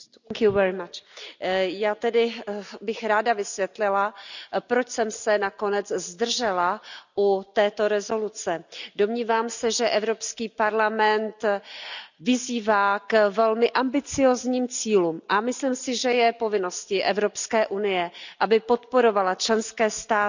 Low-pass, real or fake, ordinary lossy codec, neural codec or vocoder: 7.2 kHz; real; none; none